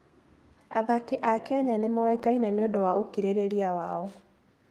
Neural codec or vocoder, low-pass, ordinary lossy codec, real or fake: codec, 32 kHz, 1.9 kbps, SNAC; 14.4 kHz; Opus, 32 kbps; fake